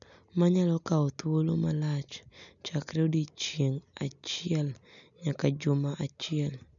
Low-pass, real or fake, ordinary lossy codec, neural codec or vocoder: 7.2 kHz; real; none; none